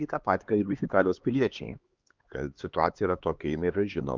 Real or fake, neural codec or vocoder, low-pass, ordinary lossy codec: fake; codec, 16 kHz, 2 kbps, X-Codec, HuBERT features, trained on LibriSpeech; 7.2 kHz; Opus, 24 kbps